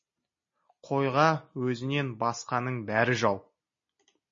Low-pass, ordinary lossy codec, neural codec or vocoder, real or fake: 7.2 kHz; MP3, 32 kbps; none; real